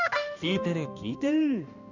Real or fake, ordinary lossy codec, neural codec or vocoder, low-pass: fake; none; codec, 16 kHz, 1 kbps, X-Codec, HuBERT features, trained on balanced general audio; 7.2 kHz